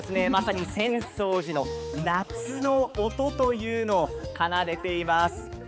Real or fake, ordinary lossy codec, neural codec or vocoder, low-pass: fake; none; codec, 16 kHz, 4 kbps, X-Codec, HuBERT features, trained on balanced general audio; none